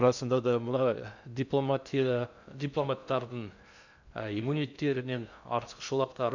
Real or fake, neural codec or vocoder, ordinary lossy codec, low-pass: fake; codec, 16 kHz in and 24 kHz out, 0.6 kbps, FocalCodec, streaming, 2048 codes; none; 7.2 kHz